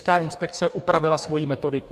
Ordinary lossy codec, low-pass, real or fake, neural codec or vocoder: AAC, 96 kbps; 14.4 kHz; fake; codec, 44.1 kHz, 2.6 kbps, DAC